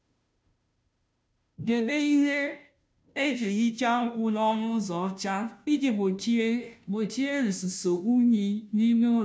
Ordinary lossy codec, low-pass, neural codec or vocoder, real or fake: none; none; codec, 16 kHz, 0.5 kbps, FunCodec, trained on Chinese and English, 25 frames a second; fake